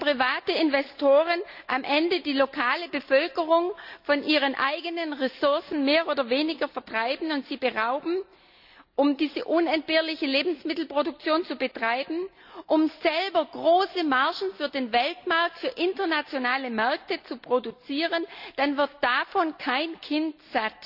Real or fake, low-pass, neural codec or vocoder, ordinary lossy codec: real; 5.4 kHz; none; none